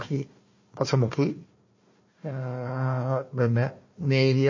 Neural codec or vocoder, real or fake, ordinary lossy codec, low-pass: codec, 16 kHz, 1 kbps, FunCodec, trained on Chinese and English, 50 frames a second; fake; MP3, 32 kbps; 7.2 kHz